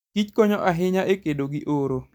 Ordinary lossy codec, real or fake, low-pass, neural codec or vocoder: none; real; 19.8 kHz; none